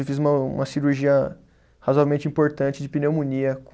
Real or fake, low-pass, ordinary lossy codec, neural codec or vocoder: real; none; none; none